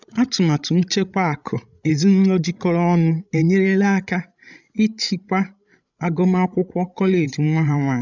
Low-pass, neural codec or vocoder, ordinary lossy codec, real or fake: 7.2 kHz; codec, 16 kHz, 16 kbps, FreqCodec, larger model; none; fake